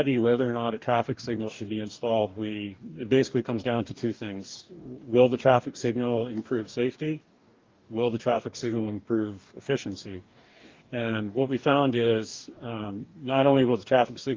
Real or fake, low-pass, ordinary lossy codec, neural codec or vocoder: fake; 7.2 kHz; Opus, 32 kbps; codec, 44.1 kHz, 2.6 kbps, DAC